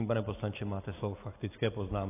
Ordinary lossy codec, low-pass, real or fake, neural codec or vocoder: AAC, 24 kbps; 3.6 kHz; real; none